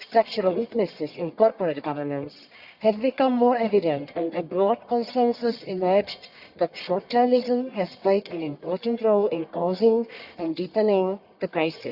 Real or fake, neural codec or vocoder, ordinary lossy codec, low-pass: fake; codec, 44.1 kHz, 1.7 kbps, Pupu-Codec; Opus, 64 kbps; 5.4 kHz